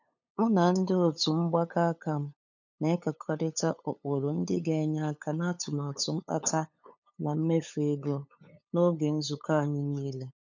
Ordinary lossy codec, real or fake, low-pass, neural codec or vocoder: none; fake; 7.2 kHz; codec, 16 kHz, 8 kbps, FunCodec, trained on LibriTTS, 25 frames a second